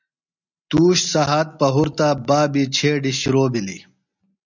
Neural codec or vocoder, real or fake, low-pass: none; real; 7.2 kHz